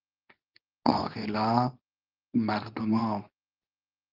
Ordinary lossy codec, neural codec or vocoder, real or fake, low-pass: Opus, 24 kbps; codec, 24 kHz, 0.9 kbps, WavTokenizer, medium speech release version 2; fake; 5.4 kHz